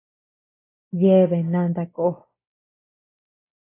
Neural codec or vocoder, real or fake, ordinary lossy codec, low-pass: none; real; AAC, 16 kbps; 3.6 kHz